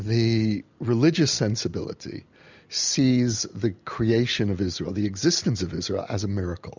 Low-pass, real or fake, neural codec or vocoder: 7.2 kHz; real; none